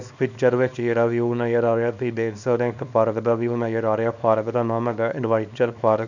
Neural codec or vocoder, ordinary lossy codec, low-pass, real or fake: codec, 24 kHz, 0.9 kbps, WavTokenizer, small release; none; 7.2 kHz; fake